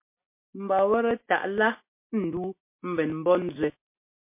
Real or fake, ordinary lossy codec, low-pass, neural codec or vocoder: real; MP3, 24 kbps; 3.6 kHz; none